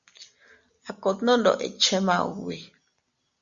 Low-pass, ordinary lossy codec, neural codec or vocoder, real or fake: 7.2 kHz; Opus, 64 kbps; none; real